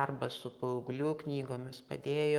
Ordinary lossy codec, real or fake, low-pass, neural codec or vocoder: Opus, 32 kbps; fake; 19.8 kHz; codec, 44.1 kHz, 7.8 kbps, Pupu-Codec